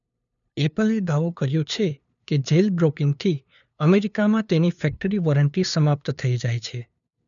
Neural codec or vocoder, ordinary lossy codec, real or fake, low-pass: codec, 16 kHz, 2 kbps, FunCodec, trained on LibriTTS, 25 frames a second; none; fake; 7.2 kHz